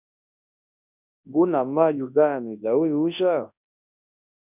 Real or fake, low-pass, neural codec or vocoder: fake; 3.6 kHz; codec, 24 kHz, 0.9 kbps, WavTokenizer, large speech release